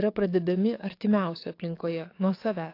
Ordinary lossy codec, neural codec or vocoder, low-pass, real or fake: AAC, 32 kbps; codec, 44.1 kHz, 7.8 kbps, DAC; 5.4 kHz; fake